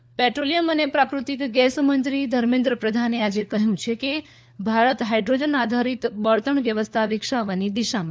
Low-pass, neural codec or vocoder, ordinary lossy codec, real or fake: none; codec, 16 kHz, 4 kbps, FunCodec, trained on LibriTTS, 50 frames a second; none; fake